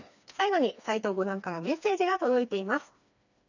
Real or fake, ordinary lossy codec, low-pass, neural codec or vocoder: fake; none; 7.2 kHz; codec, 16 kHz, 2 kbps, FreqCodec, smaller model